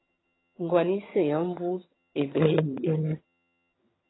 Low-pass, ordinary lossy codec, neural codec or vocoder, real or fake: 7.2 kHz; AAC, 16 kbps; vocoder, 22.05 kHz, 80 mel bands, HiFi-GAN; fake